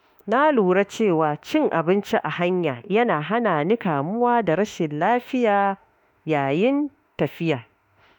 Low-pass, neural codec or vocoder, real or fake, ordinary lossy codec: 19.8 kHz; autoencoder, 48 kHz, 32 numbers a frame, DAC-VAE, trained on Japanese speech; fake; none